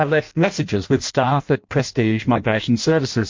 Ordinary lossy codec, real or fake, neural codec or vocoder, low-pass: MP3, 48 kbps; fake; codec, 16 kHz in and 24 kHz out, 0.6 kbps, FireRedTTS-2 codec; 7.2 kHz